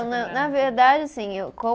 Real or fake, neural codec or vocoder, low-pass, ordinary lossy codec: real; none; none; none